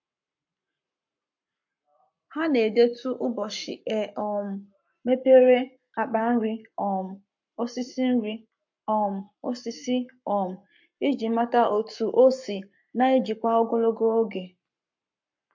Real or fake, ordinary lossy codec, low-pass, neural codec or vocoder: fake; MP3, 48 kbps; 7.2 kHz; codec, 44.1 kHz, 7.8 kbps, Pupu-Codec